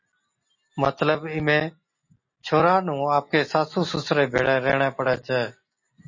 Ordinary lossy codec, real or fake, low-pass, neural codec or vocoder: MP3, 32 kbps; real; 7.2 kHz; none